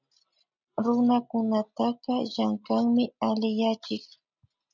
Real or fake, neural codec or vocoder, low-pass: real; none; 7.2 kHz